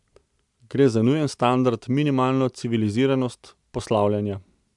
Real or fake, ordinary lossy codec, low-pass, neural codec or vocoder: real; MP3, 96 kbps; 10.8 kHz; none